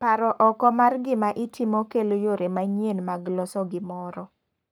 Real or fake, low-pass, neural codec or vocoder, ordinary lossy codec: fake; none; codec, 44.1 kHz, 7.8 kbps, Pupu-Codec; none